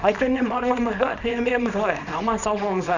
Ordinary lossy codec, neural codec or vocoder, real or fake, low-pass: none; codec, 24 kHz, 0.9 kbps, WavTokenizer, small release; fake; 7.2 kHz